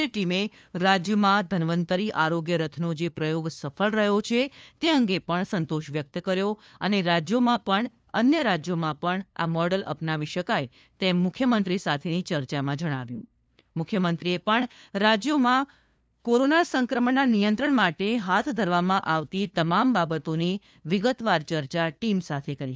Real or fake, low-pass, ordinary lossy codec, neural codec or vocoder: fake; none; none; codec, 16 kHz, 2 kbps, FunCodec, trained on LibriTTS, 25 frames a second